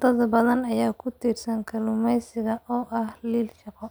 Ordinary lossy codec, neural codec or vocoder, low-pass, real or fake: none; none; none; real